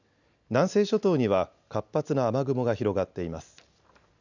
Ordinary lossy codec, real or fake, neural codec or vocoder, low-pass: none; real; none; 7.2 kHz